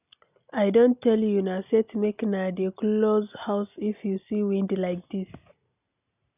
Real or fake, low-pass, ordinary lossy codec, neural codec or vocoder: real; 3.6 kHz; none; none